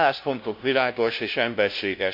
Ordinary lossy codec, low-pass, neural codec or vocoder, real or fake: MP3, 32 kbps; 5.4 kHz; codec, 16 kHz, 0.5 kbps, FunCodec, trained on LibriTTS, 25 frames a second; fake